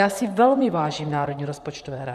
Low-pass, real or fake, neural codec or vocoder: 14.4 kHz; fake; vocoder, 44.1 kHz, 128 mel bands, Pupu-Vocoder